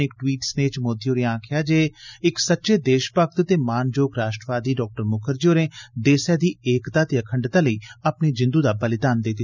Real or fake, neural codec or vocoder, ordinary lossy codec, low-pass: real; none; none; 7.2 kHz